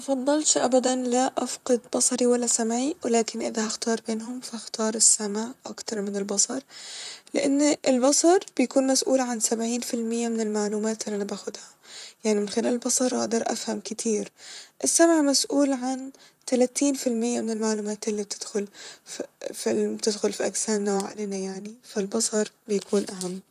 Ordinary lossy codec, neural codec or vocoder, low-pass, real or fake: none; vocoder, 44.1 kHz, 128 mel bands, Pupu-Vocoder; 14.4 kHz; fake